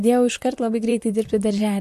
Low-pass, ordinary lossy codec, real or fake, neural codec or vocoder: 14.4 kHz; MP3, 64 kbps; fake; vocoder, 44.1 kHz, 128 mel bands, Pupu-Vocoder